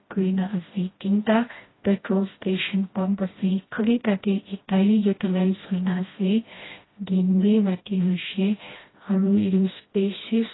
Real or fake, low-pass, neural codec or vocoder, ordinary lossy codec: fake; 7.2 kHz; codec, 16 kHz, 1 kbps, FreqCodec, smaller model; AAC, 16 kbps